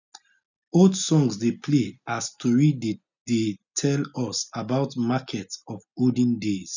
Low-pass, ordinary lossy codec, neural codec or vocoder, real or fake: 7.2 kHz; none; none; real